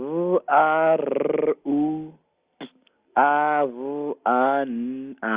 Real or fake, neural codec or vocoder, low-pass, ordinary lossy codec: real; none; 3.6 kHz; Opus, 32 kbps